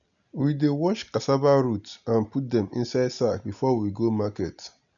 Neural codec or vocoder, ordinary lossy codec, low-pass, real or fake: none; none; 7.2 kHz; real